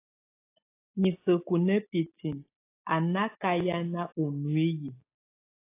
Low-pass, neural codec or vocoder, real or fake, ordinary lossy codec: 3.6 kHz; none; real; AAC, 24 kbps